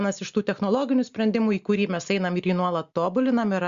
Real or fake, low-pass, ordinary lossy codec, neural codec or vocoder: real; 7.2 kHz; Opus, 64 kbps; none